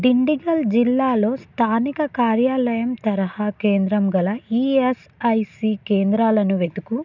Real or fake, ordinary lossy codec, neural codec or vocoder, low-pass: real; none; none; 7.2 kHz